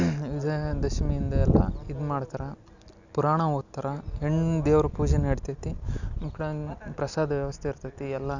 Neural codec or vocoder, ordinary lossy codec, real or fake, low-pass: none; none; real; 7.2 kHz